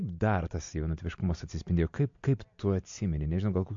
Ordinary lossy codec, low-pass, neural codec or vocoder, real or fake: MP3, 48 kbps; 7.2 kHz; none; real